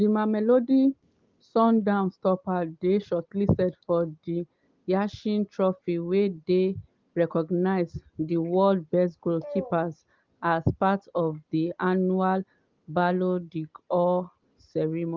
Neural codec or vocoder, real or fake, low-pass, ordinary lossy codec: none; real; 7.2 kHz; Opus, 32 kbps